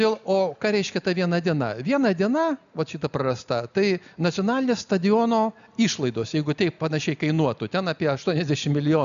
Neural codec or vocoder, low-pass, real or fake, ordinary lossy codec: none; 7.2 kHz; real; MP3, 96 kbps